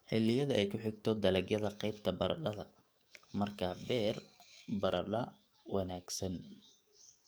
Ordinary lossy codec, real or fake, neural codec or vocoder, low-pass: none; fake; codec, 44.1 kHz, 7.8 kbps, DAC; none